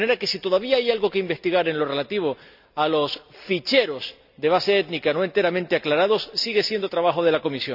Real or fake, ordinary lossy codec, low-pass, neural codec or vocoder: real; none; 5.4 kHz; none